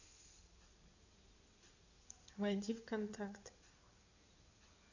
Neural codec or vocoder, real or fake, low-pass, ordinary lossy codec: codec, 16 kHz in and 24 kHz out, 2.2 kbps, FireRedTTS-2 codec; fake; 7.2 kHz; none